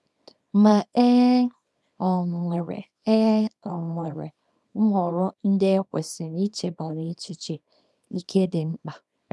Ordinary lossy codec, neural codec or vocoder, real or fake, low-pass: none; codec, 24 kHz, 0.9 kbps, WavTokenizer, small release; fake; none